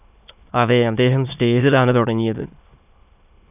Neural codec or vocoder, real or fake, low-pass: autoencoder, 22.05 kHz, a latent of 192 numbers a frame, VITS, trained on many speakers; fake; 3.6 kHz